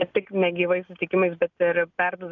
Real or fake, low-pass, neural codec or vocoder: real; 7.2 kHz; none